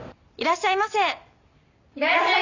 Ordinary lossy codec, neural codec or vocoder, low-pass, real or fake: none; none; 7.2 kHz; real